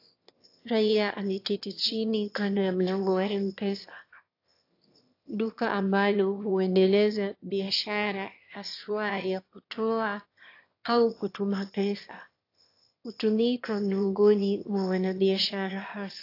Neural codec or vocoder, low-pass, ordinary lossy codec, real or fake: autoencoder, 22.05 kHz, a latent of 192 numbers a frame, VITS, trained on one speaker; 5.4 kHz; AAC, 32 kbps; fake